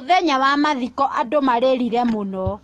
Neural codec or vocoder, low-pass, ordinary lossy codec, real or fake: autoencoder, 48 kHz, 128 numbers a frame, DAC-VAE, trained on Japanese speech; 19.8 kHz; AAC, 32 kbps; fake